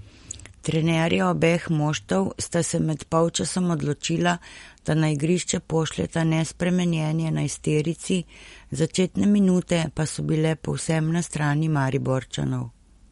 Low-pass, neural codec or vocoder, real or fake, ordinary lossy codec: 19.8 kHz; none; real; MP3, 48 kbps